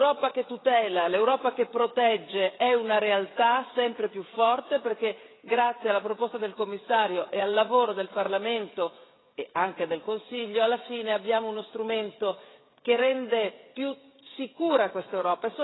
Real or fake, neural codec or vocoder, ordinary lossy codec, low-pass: fake; codec, 16 kHz, 16 kbps, FreqCodec, smaller model; AAC, 16 kbps; 7.2 kHz